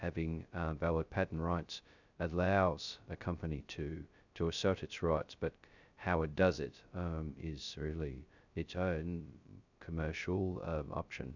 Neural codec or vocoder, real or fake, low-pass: codec, 16 kHz, 0.2 kbps, FocalCodec; fake; 7.2 kHz